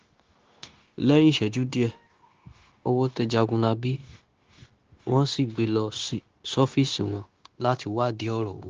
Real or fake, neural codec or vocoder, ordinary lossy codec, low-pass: fake; codec, 16 kHz, 0.9 kbps, LongCat-Audio-Codec; Opus, 16 kbps; 7.2 kHz